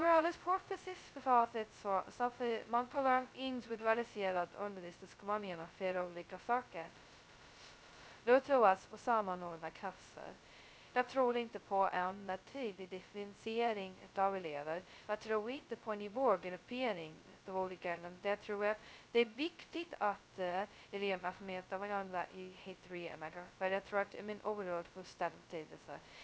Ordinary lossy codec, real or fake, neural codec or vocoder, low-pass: none; fake; codec, 16 kHz, 0.2 kbps, FocalCodec; none